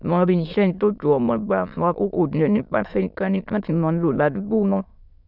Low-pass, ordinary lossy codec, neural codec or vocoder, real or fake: 5.4 kHz; none; autoencoder, 22.05 kHz, a latent of 192 numbers a frame, VITS, trained on many speakers; fake